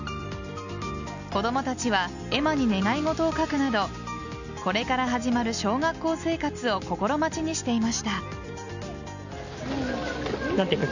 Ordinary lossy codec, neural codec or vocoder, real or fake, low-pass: none; none; real; 7.2 kHz